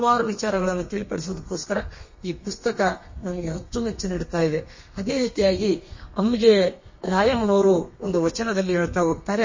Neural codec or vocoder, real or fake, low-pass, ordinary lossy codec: codec, 32 kHz, 1.9 kbps, SNAC; fake; 7.2 kHz; MP3, 32 kbps